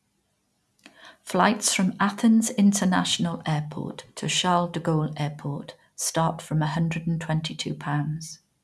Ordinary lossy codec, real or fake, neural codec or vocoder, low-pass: none; real; none; none